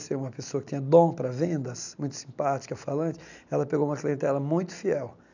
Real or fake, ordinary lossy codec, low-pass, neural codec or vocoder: real; none; 7.2 kHz; none